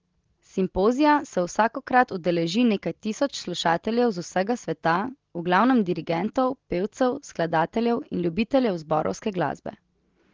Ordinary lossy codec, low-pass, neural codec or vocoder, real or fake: Opus, 16 kbps; 7.2 kHz; none; real